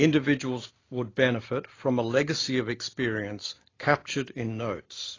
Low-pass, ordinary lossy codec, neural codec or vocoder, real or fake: 7.2 kHz; AAC, 32 kbps; none; real